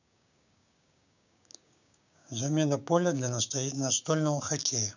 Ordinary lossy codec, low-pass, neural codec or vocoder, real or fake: none; 7.2 kHz; codec, 16 kHz, 6 kbps, DAC; fake